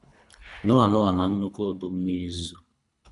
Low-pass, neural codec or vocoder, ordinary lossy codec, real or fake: 10.8 kHz; codec, 24 kHz, 3 kbps, HILCodec; none; fake